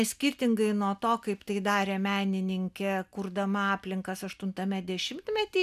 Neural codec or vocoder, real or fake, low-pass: none; real; 14.4 kHz